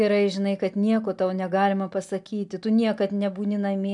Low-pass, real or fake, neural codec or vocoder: 10.8 kHz; real; none